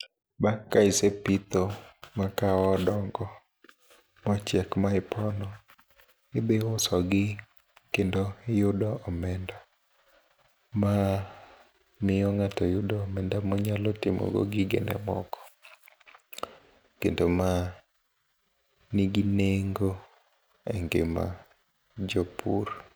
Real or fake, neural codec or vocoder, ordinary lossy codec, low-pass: real; none; none; none